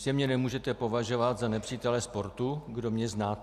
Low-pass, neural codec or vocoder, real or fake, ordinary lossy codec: 14.4 kHz; none; real; AAC, 96 kbps